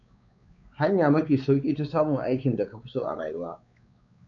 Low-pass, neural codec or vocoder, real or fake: 7.2 kHz; codec, 16 kHz, 4 kbps, X-Codec, WavLM features, trained on Multilingual LibriSpeech; fake